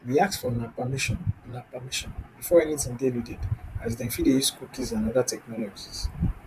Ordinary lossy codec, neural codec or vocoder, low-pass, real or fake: MP3, 96 kbps; vocoder, 44.1 kHz, 128 mel bands, Pupu-Vocoder; 14.4 kHz; fake